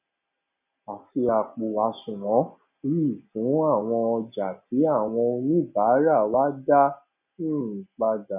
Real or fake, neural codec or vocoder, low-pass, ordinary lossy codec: real; none; 3.6 kHz; none